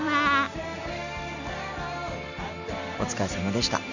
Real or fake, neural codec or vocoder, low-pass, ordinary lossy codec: real; none; 7.2 kHz; none